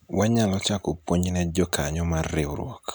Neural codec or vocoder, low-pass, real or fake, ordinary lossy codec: none; none; real; none